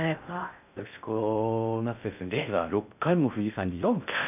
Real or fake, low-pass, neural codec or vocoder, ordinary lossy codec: fake; 3.6 kHz; codec, 16 kHz in and 24 kHz out, 0.6 kbps, FocalCodec, streaming, 2048 codes; none